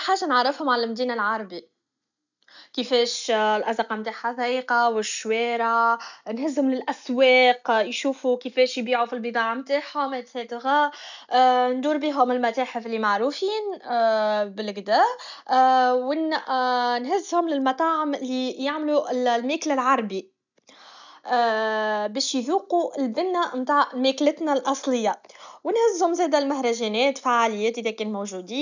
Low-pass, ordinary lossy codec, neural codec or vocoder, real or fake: 7.2 kHz; none; none; real